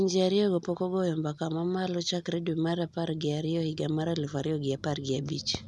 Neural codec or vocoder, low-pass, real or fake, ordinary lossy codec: none; none; real; none